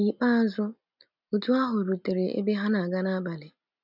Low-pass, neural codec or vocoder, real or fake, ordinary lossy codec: 5.4 kHz; none; real; none